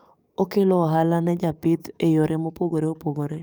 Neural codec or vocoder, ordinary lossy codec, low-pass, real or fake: codec, 44.1 kHz, 7.8 kbps, DAC; none; none; fake